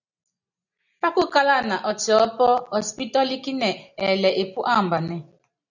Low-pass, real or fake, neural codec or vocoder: 7.2 kHz; real; none